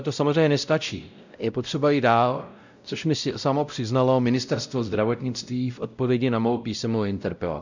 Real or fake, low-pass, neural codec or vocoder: fake; 7.2 kHz; codec, 16 kHz, 0.5 kbps, X-Codec, WavLM features, trained on Multilingual LibriSpeech